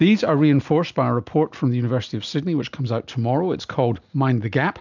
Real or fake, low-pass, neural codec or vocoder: real; 7.2 kHz; none